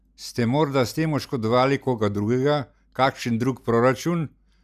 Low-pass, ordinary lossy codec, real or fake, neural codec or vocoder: 14.4 kHz; none; real; none